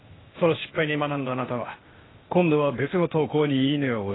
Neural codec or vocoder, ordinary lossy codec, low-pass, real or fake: codec, 16 kHz, 0.8 kbps, ZipCodec; AAC, 16 kbps; 7.2 kHz; fake